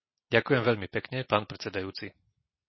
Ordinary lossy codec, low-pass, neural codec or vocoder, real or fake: MP3, 24 kbps; 7.2 kHz; none; real